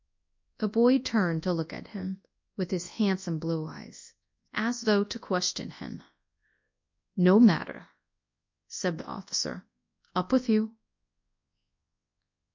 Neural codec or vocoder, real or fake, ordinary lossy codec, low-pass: codec, 24 kHz, 0.9 kbps, WavTokenizer, large speech release; fake; MP3, 64 kbps; 7.2 kHz